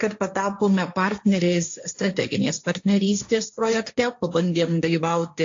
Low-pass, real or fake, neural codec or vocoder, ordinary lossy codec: 7.2 kHz; fake; codec, 16 kHz, 1.1 kbps, Voila-Tokenizer; AAC, 48 kbps